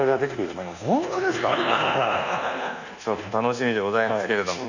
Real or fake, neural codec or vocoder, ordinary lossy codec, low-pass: fake; codec, 24 kHz, 1.2 kbps, DualCodec; none; 7.2 kHz